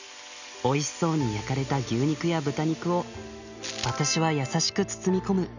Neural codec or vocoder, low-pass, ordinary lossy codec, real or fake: none; 7.2 kHz; none; real